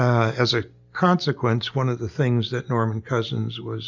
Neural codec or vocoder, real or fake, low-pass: none; real; 7.2 kHz